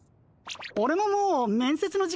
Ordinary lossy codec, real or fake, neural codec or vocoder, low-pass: none; real; none; none